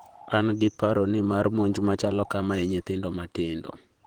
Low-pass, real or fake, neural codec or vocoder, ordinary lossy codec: 19.8 kHz; fake; vocoder, 44.1 kHz, 128 mel bands, Pupu-Vocoder; Opus, 16 kbps